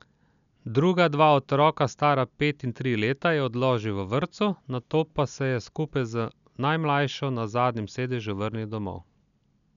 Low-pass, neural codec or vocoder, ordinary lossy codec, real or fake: 7.2 kHz; none; none; real